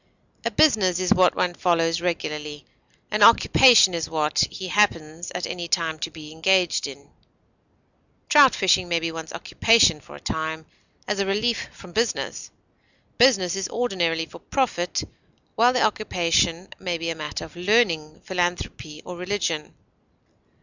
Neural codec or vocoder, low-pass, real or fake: none; 7.2 kHz; real